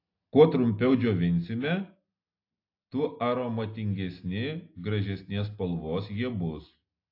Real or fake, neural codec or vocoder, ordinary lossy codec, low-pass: real; none; AAC, 32 kbps; 5.4 kHz